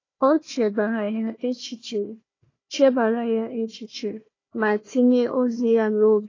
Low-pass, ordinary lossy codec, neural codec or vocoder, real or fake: 7.2 kHz; AAC, 32 kbps; codec, 16 kHz, 1 kbps, FunCodec, trained on Chinese and English, 50 frames a second; fake